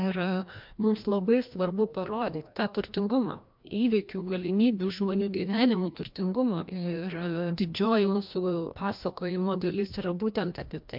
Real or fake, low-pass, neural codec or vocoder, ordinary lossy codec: fake; 5.4 kHz; codec, 16 kHz, 1 kbps, FreqCodec, larger model; MP3, 48 kbps